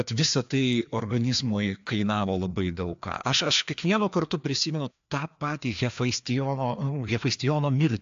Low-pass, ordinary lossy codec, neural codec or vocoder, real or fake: 7.2 kHz; AAC, 64 kbps; codec, 16 kHz, 2 kbps, FreqCodec, larger model; fake